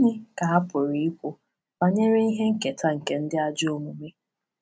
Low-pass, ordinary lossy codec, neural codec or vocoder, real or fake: none; none; none; real